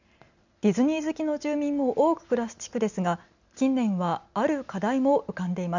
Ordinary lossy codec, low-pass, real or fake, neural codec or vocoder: none; 7.2 kHz; real; none